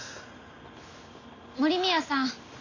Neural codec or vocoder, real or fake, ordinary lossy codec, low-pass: none; real; none; 7.2 kHz